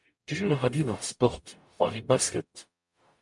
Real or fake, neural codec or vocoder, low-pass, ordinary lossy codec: fake; codec, 44.1 kHz, 0.9 kbps, DAC; 10.8 kHz; MP3, 64 kbps